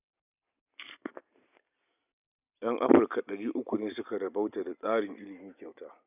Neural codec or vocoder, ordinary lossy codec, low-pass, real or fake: none; none; 3.6 kHz; real